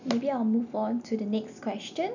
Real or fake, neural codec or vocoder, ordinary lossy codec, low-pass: real; none; none; 7.2 kHz